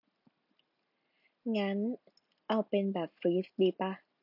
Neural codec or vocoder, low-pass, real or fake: none; 5.4 kHz; real